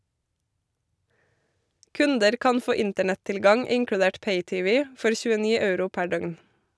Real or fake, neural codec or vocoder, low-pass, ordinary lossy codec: real; none; none; none